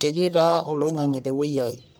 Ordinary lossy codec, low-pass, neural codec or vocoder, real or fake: none; none; codec, 44.1 kHz, 1.7 kbps, Pupu-Codec; fake